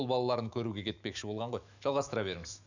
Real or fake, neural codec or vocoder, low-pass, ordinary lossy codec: fake; autoencoder, 48 kHz, 128 numbers a frame, DAC-VAE, trained on Japanese speech; 7.2 kHz; none